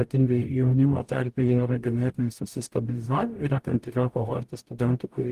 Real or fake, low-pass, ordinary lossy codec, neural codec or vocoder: fake; 14.4 kHz; Opus, 24 kbps; codec, 44.1 kHz, 0.9 kbps, DAC